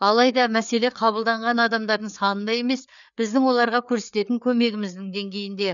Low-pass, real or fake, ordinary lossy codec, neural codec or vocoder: 7.2 kHz; fake; none; codec, 16 kHz, 4 kbps, FreqCodec, larger model